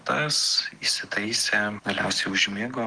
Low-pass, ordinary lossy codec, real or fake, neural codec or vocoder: 9.9 kHz; Opus, 16 kbps; real; none